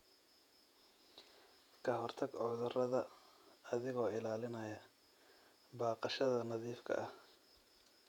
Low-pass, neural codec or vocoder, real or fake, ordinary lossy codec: 19.8 kHz; vocoder, 48 kHz, 128 mel bands, Vocos; fake; none